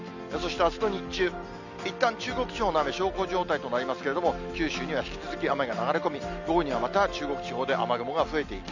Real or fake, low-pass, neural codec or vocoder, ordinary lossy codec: real; 7.2 kHz; none; none